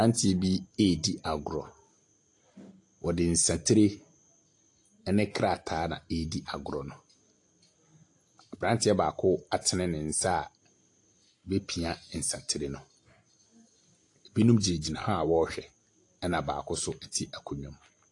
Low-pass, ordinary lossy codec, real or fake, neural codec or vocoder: 10.8 kHz; AAC, 48 kbps; real; none